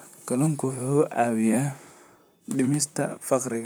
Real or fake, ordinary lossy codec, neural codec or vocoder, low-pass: fake; none; vocoder, 44.1 kHz, 128 mel bands, Pupu-Vocoder; none